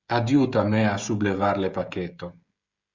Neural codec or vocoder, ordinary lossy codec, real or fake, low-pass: codec, 16 kHz, 16 kbps, FreqCodec, smaller model; Opus, 64 kbps; fake; 7.2 kHz